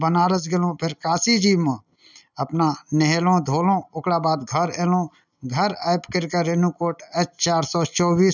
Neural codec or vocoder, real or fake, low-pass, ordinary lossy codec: none; real; 7.2 kHz; none